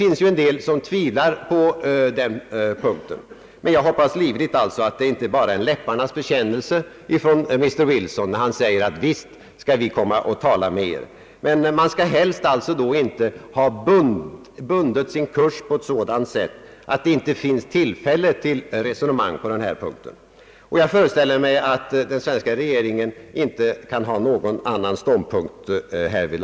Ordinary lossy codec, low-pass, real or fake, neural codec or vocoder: none; none; real; none